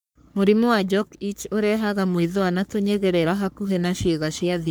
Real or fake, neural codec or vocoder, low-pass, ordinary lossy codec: fake; codec, 44.1 kHz, 3.4 kbps, Pupu-Codec; none; none